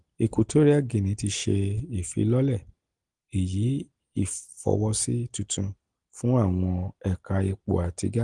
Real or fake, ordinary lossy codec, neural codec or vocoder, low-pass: real; Opus, 24 kbps; none; 10.8 kHz